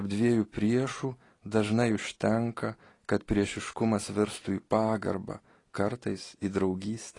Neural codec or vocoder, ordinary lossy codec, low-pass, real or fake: none; AAC, 32 kbps; 10.8 kHz; real